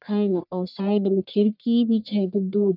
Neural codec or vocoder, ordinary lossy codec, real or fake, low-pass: codec, 44.1 kHz, 1.7 kbps, Pupu-Codec; none; fake; 5.4 kHz